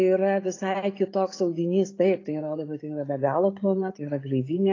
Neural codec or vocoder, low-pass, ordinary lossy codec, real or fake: none; 7.2 kHz; AAC, 32 kbps; real